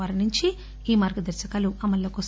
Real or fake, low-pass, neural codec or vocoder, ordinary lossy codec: real; none; none; none